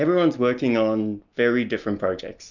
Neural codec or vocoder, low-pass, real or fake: none; 7.2 kHz; real